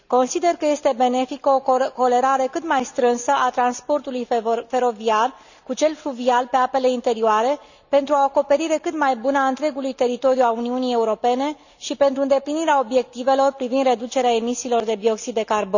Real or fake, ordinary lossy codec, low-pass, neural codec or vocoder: real; none; 7.2 kHz; none